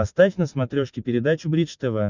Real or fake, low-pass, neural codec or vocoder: real; 7.2 kHz; none